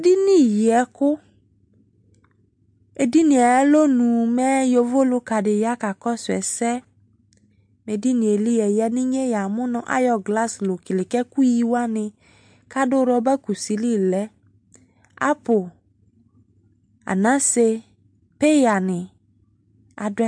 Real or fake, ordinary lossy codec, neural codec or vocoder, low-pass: real; MP3, 64 kbps; none; 9.9 kHz